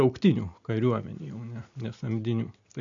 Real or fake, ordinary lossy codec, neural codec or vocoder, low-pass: real; AAC, 64 kbps; none; 7.2 kHz